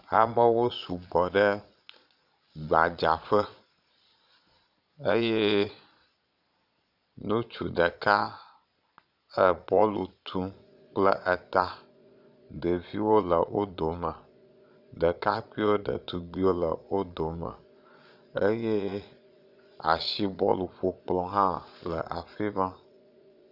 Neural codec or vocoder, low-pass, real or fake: vocoder, 22.05 kHz, 80 mel bands, Vocos; 5.4 kHz; fake